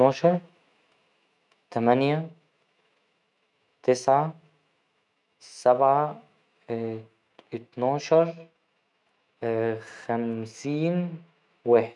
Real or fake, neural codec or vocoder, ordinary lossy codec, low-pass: fake; autoencoder, 48 kHz, 128 numbers a frame, DAC-VAE, trained on Japanese speech; none; 10.8 kHz